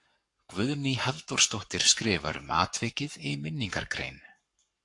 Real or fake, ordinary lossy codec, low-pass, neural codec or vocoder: fake; AAC, 64 kbps; 10.8 kHz; codec, 44.1 kHz, 7.8 kbps, Pupu-Codec